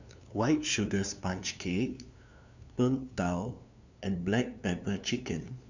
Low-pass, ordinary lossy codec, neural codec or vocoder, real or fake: 7.2 kHz; none; codec, 16 kHz, 2 kbps, FunCodec, trained on LibriTTS, 25 frames a second; fake